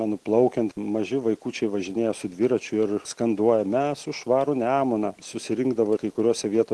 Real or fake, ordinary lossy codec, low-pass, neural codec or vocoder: real; Opus, 16 kbps; 10.8 kHz; none